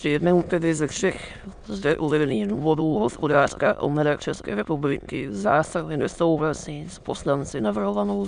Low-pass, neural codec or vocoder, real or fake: 9.9 kHz; autoencoder, 22.05 kHz, a latent of 192 numbers a frame, VITS, trained on many speakers; fake